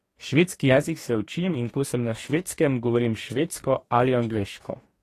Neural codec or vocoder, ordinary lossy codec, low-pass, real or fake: codec, 44.1 kHz, 2.6 kbps, DAC; AAC, 48 kbps; 14.4 kHz; fake